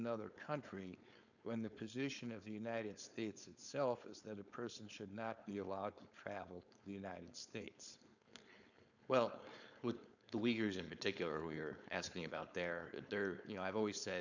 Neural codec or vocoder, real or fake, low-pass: codec, 16 kHz, 4.8 kbps, FACodec; fake; 7.2 kHz